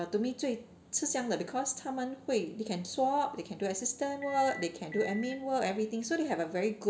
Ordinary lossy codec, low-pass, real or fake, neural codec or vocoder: none; none; real; none